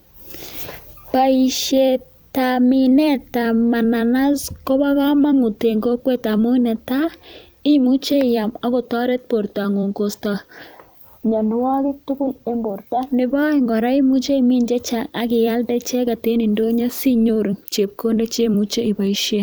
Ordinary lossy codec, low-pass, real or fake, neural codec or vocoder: none; none; fake; vocoder, 44.1 kHz, 128 mel bands every 256 samples, BigVGAN v2